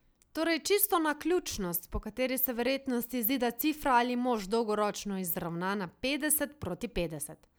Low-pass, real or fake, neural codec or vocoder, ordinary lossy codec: none; real; none; none